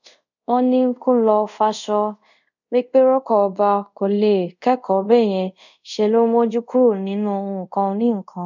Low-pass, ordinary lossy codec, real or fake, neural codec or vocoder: 7.2 kHz; none; fake; codec, 24 kHz, 0.5 kbps, DualCodec